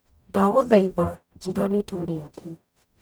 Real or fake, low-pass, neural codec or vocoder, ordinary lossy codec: fake; none; codec, 44.1 kHz, 0.9 kbps, DAC; none